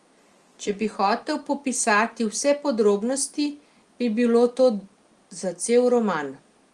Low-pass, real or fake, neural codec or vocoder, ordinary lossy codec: 10.8 kHz; real; none; Opus, 24 kbps